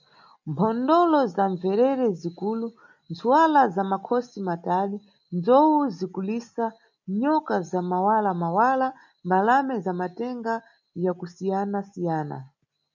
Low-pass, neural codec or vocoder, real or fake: 7.2 kHz; none; real